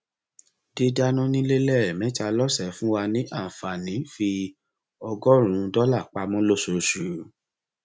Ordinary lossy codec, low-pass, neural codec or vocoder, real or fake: none; none; none; real